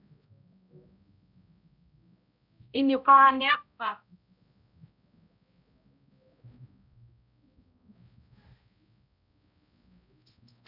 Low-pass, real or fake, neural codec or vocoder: 5.4 kHz; fake; codec, 16 kHz, 0.5 kbps, X-Codec, HuBERT features, trained on balanced general audio